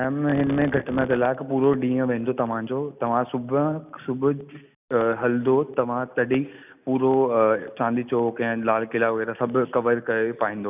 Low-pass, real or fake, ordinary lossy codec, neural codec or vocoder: 3.6 kHz; real; none; none